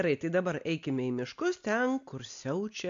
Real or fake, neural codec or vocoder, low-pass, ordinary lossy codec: real; none; 7.2 kHz; AAC, 64 kbps